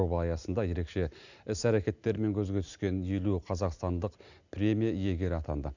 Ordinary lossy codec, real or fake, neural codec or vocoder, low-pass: none; real; none; 7.2 kHz